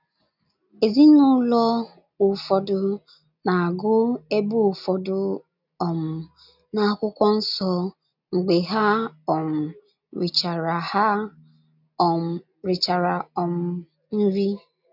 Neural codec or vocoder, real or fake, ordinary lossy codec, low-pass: none; real; none; 5.4 kHz